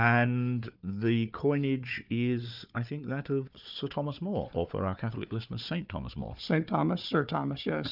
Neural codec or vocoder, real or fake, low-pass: codec, 16 kHz, 4 kbps, FunCodec, trained on Chinese and English, 50 frames a second; fake; 5.4 kHz